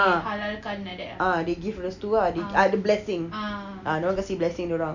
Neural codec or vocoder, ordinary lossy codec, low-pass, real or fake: none; none; 7.2 kHz; real